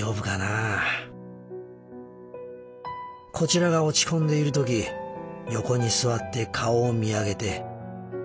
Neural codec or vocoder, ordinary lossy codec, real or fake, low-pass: none; none; real; none